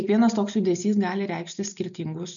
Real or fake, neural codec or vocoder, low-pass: real; none; 7.2 kHz